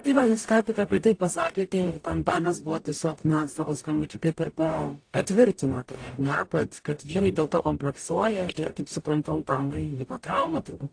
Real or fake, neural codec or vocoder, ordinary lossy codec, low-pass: fake; codec, 44.1 kHz, 0.9 kbps, DAC; AAC, 48 kbps; 9.9 kHz